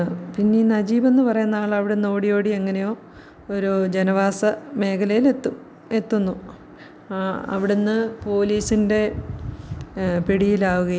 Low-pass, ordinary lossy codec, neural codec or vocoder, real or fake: none; none; none; real